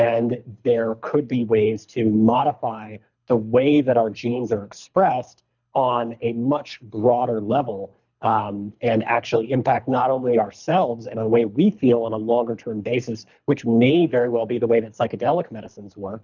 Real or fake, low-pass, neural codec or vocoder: fake; 7.2 kHz; codec, 24 kHz, 3 kbps, HILCodec